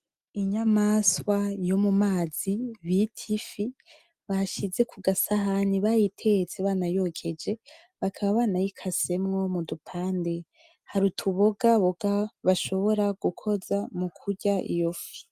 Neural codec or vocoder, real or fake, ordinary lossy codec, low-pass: none; real; Opus, 32 kbps; 14.4 kHz